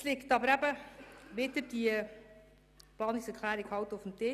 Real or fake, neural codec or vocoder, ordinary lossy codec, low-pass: real; none; none; 14.4 kHz